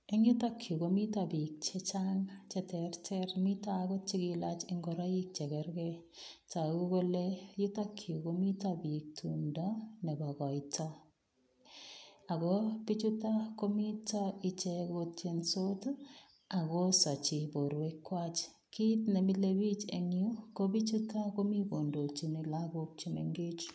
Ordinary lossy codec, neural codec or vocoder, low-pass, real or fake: none; none; none; real